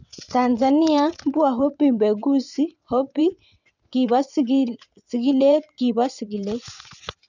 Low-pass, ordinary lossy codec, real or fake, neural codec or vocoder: 7.2 kHz; none; fake; vocoder, 22.05 kHz, 80 mel bands, WaveNeXt